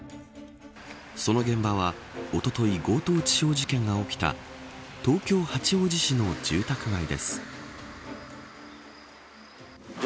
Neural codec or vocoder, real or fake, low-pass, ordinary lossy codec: none; real; none; none